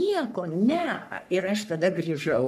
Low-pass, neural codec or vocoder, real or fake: 14.4 kHz; codec, 44.1 kHz, 3.4 kbps, Pupu-Codec; fake